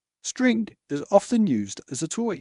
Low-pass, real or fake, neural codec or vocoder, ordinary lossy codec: 10.8 kHz; fake; codec, 24 kHz, 0.9 kbps, WavTokenizer, medium speech release version 1; MP3, 96 kbps